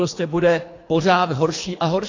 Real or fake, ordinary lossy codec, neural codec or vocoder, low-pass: fake; AAC, 32 kbps; codec, 24 kHz, 3 kbps, HILCodec; 7.2 kHz